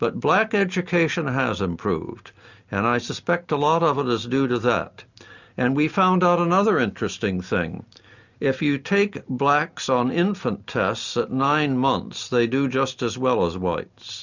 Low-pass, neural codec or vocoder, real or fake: 7.2 kHz; none; real